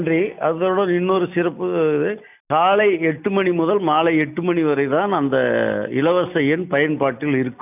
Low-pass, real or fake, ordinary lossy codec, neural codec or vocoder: 3.6 kHz; real; none; none